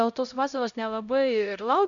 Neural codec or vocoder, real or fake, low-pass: codec, 16 kHz, 0.5 kbps, X-Codec, HuBERT features, trained on LibriSpeech; fake; 7.2 kHz